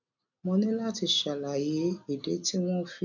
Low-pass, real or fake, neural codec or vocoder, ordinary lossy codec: 7.2 kHz; real; none; none